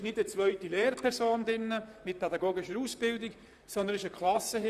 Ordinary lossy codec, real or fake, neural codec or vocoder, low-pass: none; fake; vocoder, 44.1 kHz, 128 mel bands, Pupu-Vocoder; 14.4 kHz